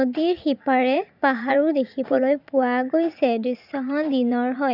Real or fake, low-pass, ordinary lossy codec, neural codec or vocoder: fake; 5.4 kHz; none; vocoder, 44.1 kHz, 80 mel bands, Vocos